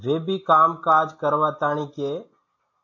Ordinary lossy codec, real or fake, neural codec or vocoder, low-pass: AAC, 48 kbps; real; none; 7.2 kHz